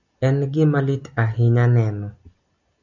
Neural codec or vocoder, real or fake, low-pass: none; real; 7.2 kHz